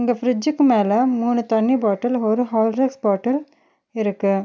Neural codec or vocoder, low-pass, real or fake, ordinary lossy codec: none; none; real; none